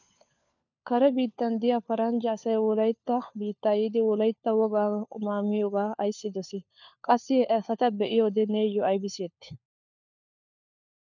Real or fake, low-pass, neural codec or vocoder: fake; 7.2 kHz; codec, 16 kHz, 4 kbps, FunCodec, trained on LibriTTS, 50 frames a second